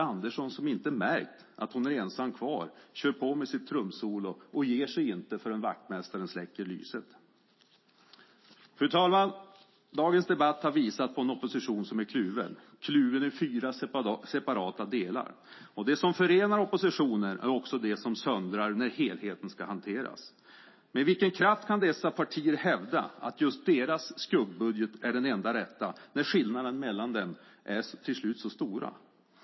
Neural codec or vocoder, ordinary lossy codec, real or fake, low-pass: none; MP3, 24 kbps; real; 7.2 kHz